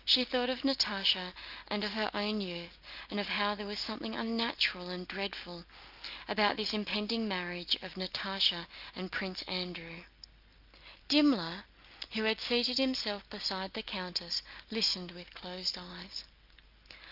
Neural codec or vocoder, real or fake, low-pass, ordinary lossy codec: none; real; 5.4 kHz; Opus, 32 kbps